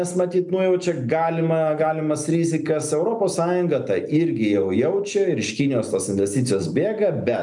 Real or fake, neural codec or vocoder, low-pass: real; none; 10.8 kHz